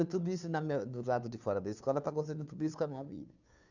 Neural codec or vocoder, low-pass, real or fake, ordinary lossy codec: codec, 16 kHz, 2 kbps, FunCodec, trained on Chinese and English, 25 frames a second; 7.2 kHz; fake; none